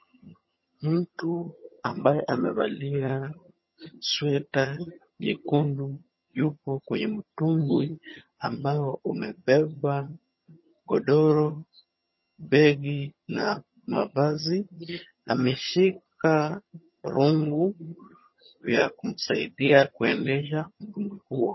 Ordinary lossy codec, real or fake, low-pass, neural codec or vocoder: MP3, 24 kbps; fake; 7.2 kHz; vocoder, 22.05 kHz, 80 mel bands, HiFi-GAN